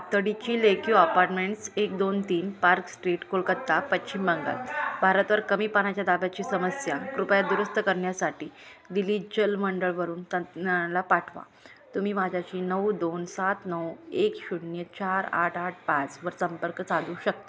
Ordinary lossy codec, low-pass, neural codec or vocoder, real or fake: none; none; none; real